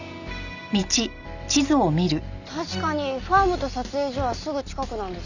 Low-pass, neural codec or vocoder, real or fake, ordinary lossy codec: 7.2 kHz; none; real; none